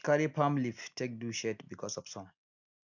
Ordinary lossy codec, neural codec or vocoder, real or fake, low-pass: none; none; real; 7.2 kHz